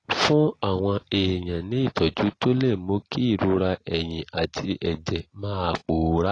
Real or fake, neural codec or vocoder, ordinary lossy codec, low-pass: real; none; AAC, 32 kbps; 9.9 kHz